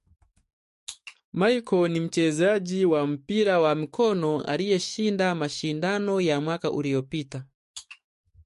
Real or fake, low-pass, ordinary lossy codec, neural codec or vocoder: fake; 14.4 kHz; MP3, 48 kbps; codec, 44.1 kHz, 7.8 kbps, DAC